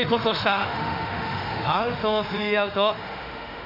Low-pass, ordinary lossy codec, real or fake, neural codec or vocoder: 5.4 kHz; none; fake; autoencoder, 48 kHz, 32 numbers a frame, DAC-VAE, trained on Japanese speech